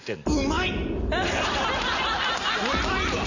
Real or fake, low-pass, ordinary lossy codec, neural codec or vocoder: real; 7.2 kHz; none; none